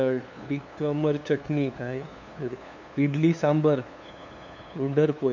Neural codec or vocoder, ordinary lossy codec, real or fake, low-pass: codec, 16 kHz, 2 kbps, FunCodec, trained on LibriTTS, 25 frames a second; none; fake; 7.2 kHz